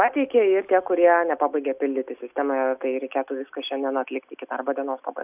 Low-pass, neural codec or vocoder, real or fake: 3.6 kHz; none; real